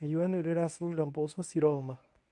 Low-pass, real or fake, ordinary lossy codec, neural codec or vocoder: 10.8 kHz; fake; none; codec, 24 kHz, 0.9 kbps, WavTokenizer, medium speech release version 1